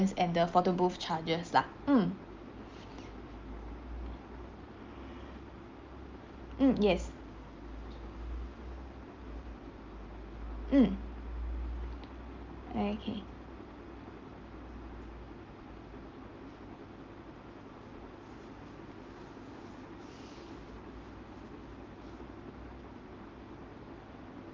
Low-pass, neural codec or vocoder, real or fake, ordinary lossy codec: 7.2 kHz; none; real; Opus, 24 kbps